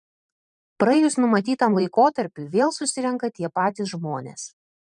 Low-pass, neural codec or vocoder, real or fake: 10.8 kHz; vocoder, 44.1 kHz, 128 mel bands every 512 samples, BigVGAN v2; fake